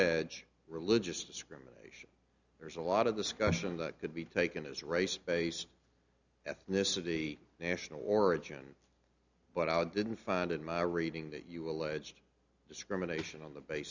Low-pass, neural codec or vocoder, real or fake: 7.2 kHz; none; real